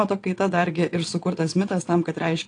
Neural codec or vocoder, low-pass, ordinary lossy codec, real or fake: vocoder, 22.05 kHz, 80 mel bands, WaveNeXt; 9.9 kHz; AAC, 48 kbps; fake